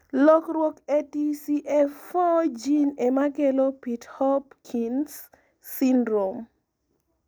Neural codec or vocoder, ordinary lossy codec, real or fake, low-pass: none; none; real; none